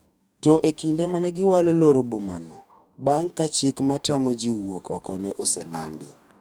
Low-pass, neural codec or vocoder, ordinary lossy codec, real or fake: none; codec, 44.1 kHz, 2.6 kbps, DAC; none; fake